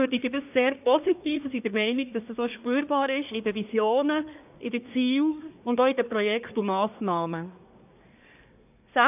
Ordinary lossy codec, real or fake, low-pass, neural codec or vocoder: none; fake; 3.6 kHz; codec, 24 kHz, 1 kbps, SNAC